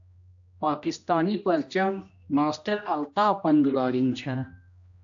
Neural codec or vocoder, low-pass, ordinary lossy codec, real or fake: codec, 16 kHz, 1 kbps, X-Codec, HuBERT features, trained on general audio; 7.2 kHz; AAC, 64 kbps; fake